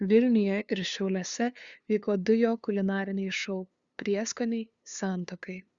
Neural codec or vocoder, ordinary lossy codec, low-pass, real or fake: codec, 16 kHz, 2 kbps, FunCodec, trained on LibriTTS, 25 frames a second; Opus, 64 kbps; 7.2 kHz; fake